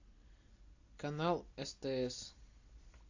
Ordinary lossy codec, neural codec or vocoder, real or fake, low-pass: AAC, 48 kbps; none; real; 7.2 kHz